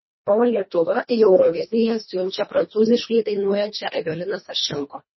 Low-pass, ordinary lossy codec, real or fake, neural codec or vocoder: 7.2 kHz; MP3, 24 kbps; fake; codec, 24 kHz, 1.5 kbps, HILCodec